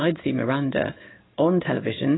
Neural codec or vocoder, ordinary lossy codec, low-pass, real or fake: codec, 16 kHz in and 24 kHz out, 1 kbps, XY-Tokenizer; AAC, 16 kbps; 7.2 kHz; fake